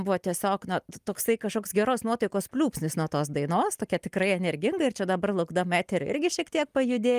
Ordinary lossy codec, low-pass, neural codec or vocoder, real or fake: Opus, 32 kbps; 14.4 kHz; none; real